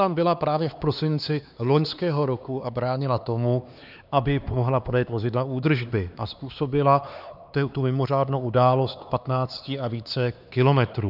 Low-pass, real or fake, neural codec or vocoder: 5.4 kHz; fake; codec, 16 kHz, 4 kbps, X-Codec, HuBERT features, trained on LibriSpeech